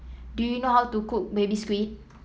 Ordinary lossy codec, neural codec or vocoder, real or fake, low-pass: none; none; real; none